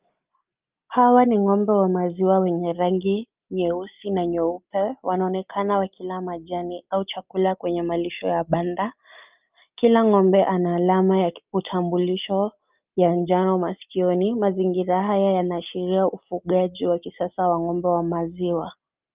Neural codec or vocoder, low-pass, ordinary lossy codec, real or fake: none; 3.6 kHz; Opus, 24 kbps; real